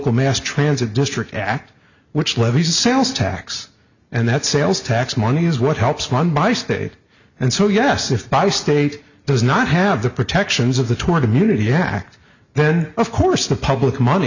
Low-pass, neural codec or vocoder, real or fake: 7.2 kHz; none; real